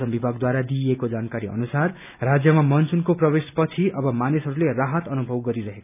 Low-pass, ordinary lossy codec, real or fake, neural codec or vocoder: 3.6 kHz; none; real; none